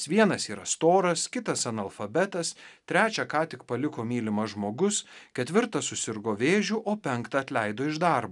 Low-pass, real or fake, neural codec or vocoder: 10.8 kHz; real; none